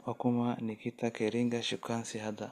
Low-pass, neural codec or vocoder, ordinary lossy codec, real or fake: 14.4 kHz; none; Opus, 64 kbps; real